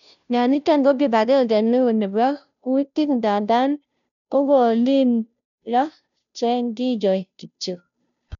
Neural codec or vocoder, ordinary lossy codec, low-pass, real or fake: codec, 16 kHz, 0.5 kbps, FunCodec, trained on Chinese and English, 25 frames a second; none; 7.2 kHz; fake